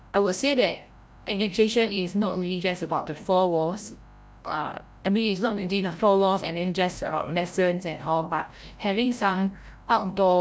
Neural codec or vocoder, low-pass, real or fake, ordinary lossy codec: codec, 16 kHz, 0.5 kbps, FreqCodec, larger model; none; fake; none